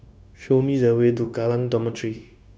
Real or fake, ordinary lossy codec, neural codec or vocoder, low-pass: fake; none; codec, 16 kHz, 0.9 kbps, LongCat-Audio-Codec; none